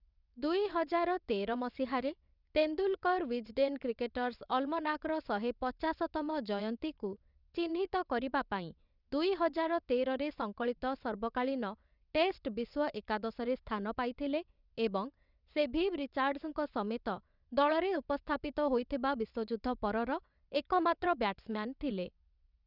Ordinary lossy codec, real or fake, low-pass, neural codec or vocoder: none; fake; 5.4 kHz; vocoder, 22.05 kHz, 80 mel bands, WaveNeXt